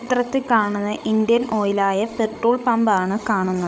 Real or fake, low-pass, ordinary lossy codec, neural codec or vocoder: fake; none; none; codec, 16 kHz, 16 kbps, FreqCodec, larger model